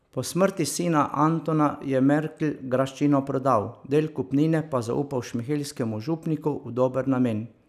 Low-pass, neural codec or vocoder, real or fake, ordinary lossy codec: 14.4 kHz; none; real; none